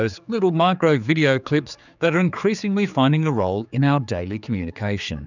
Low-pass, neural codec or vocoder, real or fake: 7.2 kHz; codec, 16 kHz, 4 kbps, X-Codec, HuBERT features, trained on general audio; fake